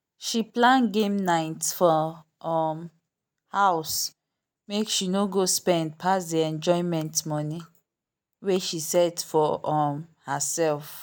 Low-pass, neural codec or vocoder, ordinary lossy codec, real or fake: none; none; none; real